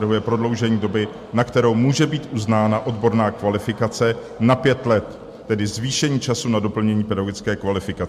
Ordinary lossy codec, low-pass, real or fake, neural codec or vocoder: MP3, 64 kbps; 14.4 kHz; real; none